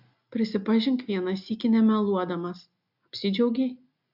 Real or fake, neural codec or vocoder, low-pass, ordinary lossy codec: real; none; 5.4 kHz; AAC, 48 kbps